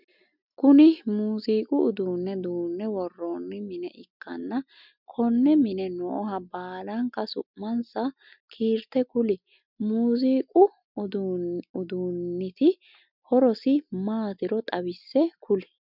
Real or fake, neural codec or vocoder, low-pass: real; none; 5.4 kHz